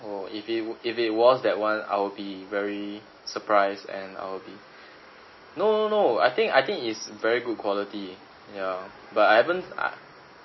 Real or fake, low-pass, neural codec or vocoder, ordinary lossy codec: real; 7.2 kHz; none; MP3, 24 kbps